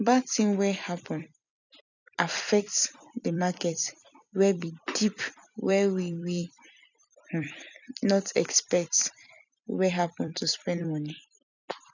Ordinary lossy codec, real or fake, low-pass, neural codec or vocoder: none; fake; 7.2 kHz; vocoder, 44.1 kHz, 128 mel bands every 256 samples, BigVGAN v2